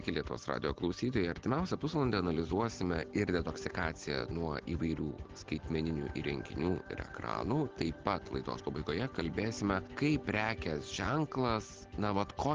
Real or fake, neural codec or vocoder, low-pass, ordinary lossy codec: real; none; 7.2 kHz; Opus, 16 kbps